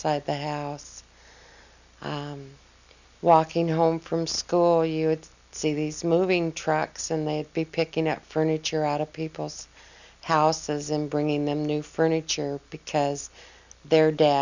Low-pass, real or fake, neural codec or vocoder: 7.2 kHz; real; none